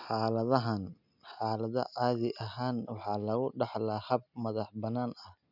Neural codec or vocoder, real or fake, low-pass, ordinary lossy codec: none; real; 5.4 kHz; none